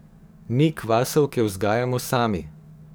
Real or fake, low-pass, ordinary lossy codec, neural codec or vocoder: fake; none; none; codec, 44.1 kHz, 7.8 kbps, DAC